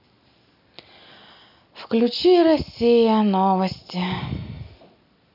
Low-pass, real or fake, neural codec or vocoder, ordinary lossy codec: 5.4 kHz; real; none; none